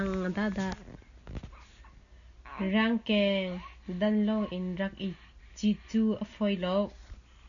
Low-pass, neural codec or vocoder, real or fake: 7.2 kHz; none; real